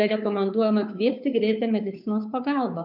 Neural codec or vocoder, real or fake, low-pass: codec, 16 kHz, 2 kbps, FunCodec, trained on Chinese and English, 25 frames a second; fake; 5.4 kHz